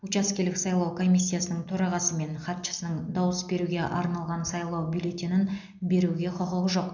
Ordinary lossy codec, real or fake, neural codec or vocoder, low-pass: none; real; none; 7.2 kHz